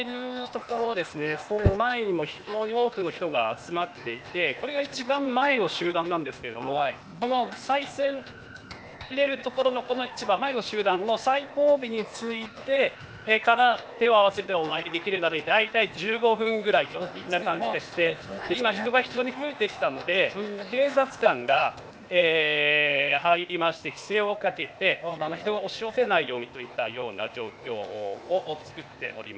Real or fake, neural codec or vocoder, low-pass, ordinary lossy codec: fake; codec, 16 kHz, 0.8 kbps, ZipCodec; none; none